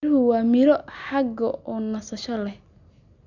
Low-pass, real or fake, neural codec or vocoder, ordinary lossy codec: 7.2 kHz; real; none; none